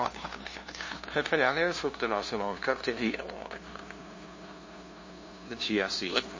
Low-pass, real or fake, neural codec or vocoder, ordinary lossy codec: 7.2 kHz; fake; codec, 16 kHz, 0.5 kbps, FunCodec, trained on LibriTTS, 25 frames a second; MP3, 32 kbps